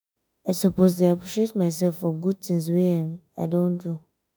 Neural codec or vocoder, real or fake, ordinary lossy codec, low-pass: autoencoder, 48 kHz, 32 numbers a frame, DAC-VAE, trained on Japanese speech; fake; none; none